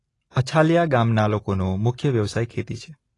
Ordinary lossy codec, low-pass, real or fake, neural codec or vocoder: AAC, 32 kbps; 10.8 kHz; real; none